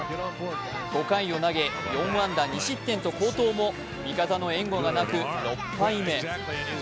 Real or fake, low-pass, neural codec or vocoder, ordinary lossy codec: real; none; none; none